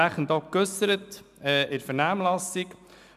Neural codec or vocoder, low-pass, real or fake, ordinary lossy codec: none; 14.4 kHz; real; none